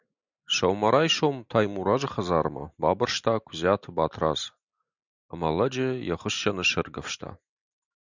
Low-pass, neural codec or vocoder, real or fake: 7.2 kHz; none; real